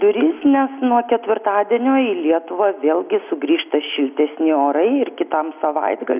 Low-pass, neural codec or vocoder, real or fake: 3.6 kHz; none; real